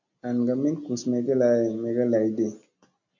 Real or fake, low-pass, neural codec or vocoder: real; 7.2 kHz; none